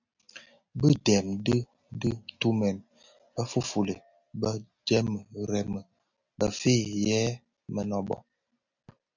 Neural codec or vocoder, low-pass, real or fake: none; 7.2 kHz; real